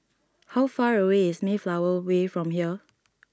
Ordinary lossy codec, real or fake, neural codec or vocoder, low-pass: none; real; none; none